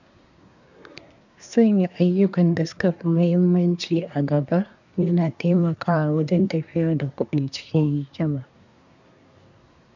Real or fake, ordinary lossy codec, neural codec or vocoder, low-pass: fake; none; codec, 24 kHz, 1 kbps, SNAC; 7.2 kHz